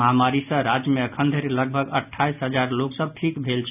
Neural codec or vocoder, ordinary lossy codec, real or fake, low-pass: none; none; real; 3.6 kHz